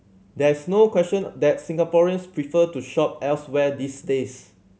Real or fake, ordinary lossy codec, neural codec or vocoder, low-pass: real; none; none; none